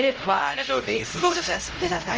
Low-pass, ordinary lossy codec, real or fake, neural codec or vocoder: 7.2 kHz; Opus, 24 kbps; fake; codec, 16 kHz, 0.5 kbps, X-Codec, HuBERT features, trained on LibriSpeech